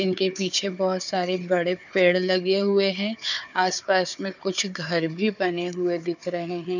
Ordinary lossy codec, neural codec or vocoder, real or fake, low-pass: none; codec, 16 kHz, 4 kbps, FunCodec, trained on Chinese and English, 50 frames a second; fake; 7.2 kHz